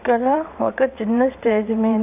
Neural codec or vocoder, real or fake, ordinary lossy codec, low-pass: vocoder, 22.05 kHz, 80 mel bands, WaveNeXt; fake; none; 3.6 kHz